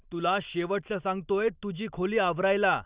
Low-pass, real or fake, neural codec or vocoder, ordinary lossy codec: 3.6 kHz; real; none; Opus, 64 kbps